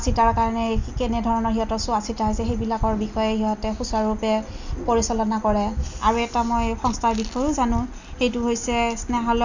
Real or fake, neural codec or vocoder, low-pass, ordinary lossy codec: real; none; 7.2 kHz; Opus, 64 kbps